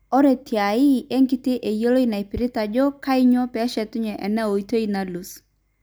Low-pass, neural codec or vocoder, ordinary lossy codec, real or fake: none; none; none; real